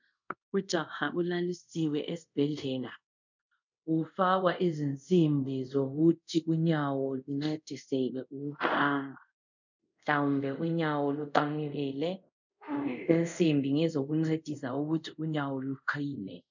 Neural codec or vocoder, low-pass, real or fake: codec, 24 kHz, 0.5 kbps, DualCodec; 7.2 kHz; fake